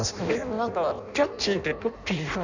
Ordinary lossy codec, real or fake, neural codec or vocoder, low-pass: none; fake; codec, 16 kHz in and 24 kHz out, 0.6 kbps, FireRedTTS-2 codec; 7.2 kHz